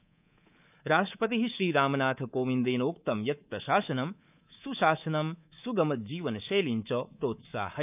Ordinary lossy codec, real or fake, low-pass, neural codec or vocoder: none; fake; 3.6 kHz; codec, 24 kHz, 3.1 kbps, DualCodec